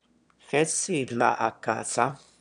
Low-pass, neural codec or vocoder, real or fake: 9.9 kHz; autoencoder, 22.05 kHz, a latent of 192 numbers a frame, VITS, trained on one speaker; fake